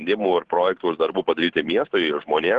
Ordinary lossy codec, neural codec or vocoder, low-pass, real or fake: Opus, 16 kbps; vocoder, 44.1 kHz, 128 mel bands every 512 samples, BigVGAN v2; 10.8 kHz; fake